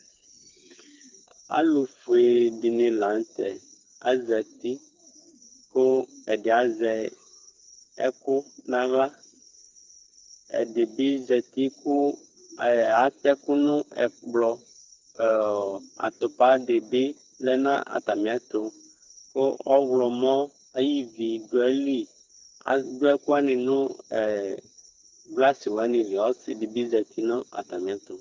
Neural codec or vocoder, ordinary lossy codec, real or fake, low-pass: codec, 16 kHz, 4 kbps, FreqCodec, smaller model; Opus, 24 kbps; fake; 7.2 kHz